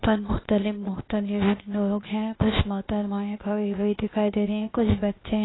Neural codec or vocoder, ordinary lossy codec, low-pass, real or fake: codec, 16 kHz, 0.8 kbps, ZipCodec; AAC, 16 kbps; 7.2 kHz; fake